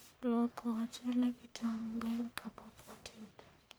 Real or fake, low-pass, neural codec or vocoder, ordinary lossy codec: fake; none; codec, 44.1 kHz, 1.7 kbps, Pupu-Codec; none